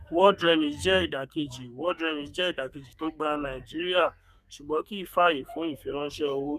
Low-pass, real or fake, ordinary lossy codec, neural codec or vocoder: 14.4 kHz; fake; none; codec, 32 kHz, 1.9 kbps, SNAC